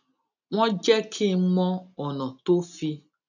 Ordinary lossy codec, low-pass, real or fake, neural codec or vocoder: none; 7.2 kHz; real; none